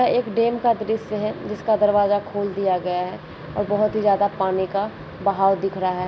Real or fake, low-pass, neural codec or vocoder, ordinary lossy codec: real; none; none; none